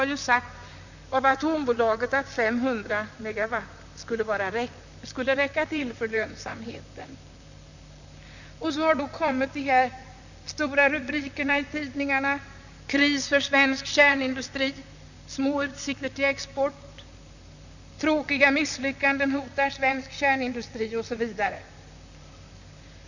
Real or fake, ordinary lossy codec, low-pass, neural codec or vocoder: fake; none; 7.2 kHz; vocoder, 44.1 kHz, 128 mel bands, Pupu-Vocoder